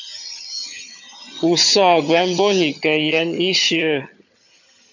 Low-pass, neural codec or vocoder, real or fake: 7.2 kHz; vocoder, 22.05 kHz, 80 mel bands, HiFi-GAN; fake